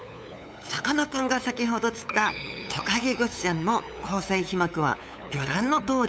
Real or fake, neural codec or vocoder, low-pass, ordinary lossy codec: fake; codec, 16 kHz, 8 kbps, FunCodec, trained on LibriTTS, 25 frames a second; none; none